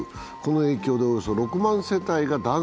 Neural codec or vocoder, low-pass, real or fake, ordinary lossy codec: none; none; real; none